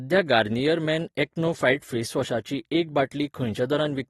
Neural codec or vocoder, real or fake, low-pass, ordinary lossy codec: vocoder, 44.1 kHz, 128 mel bands every 256 samples, BigVGAN v2; fake; 19.8 kHz; AAC, 32 kbps